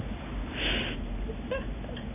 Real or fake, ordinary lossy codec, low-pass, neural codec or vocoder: real; none; 3.6 kHz; none